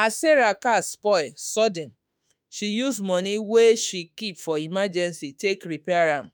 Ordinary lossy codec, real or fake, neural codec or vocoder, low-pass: none; fake; autoencoder, 48 kHz, 32 numbers a frame, DAC-VAE, trained on Japanese speech; none